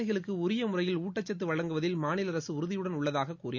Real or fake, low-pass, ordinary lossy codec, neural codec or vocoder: real; 7.2 kHz; none; none